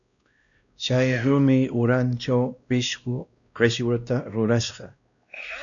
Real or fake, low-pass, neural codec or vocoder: fake; 7.2 kHz; codec, 16 kHz, 1 kbps, X-Codec, WavLM features, trained on Multilingual LibriSpeech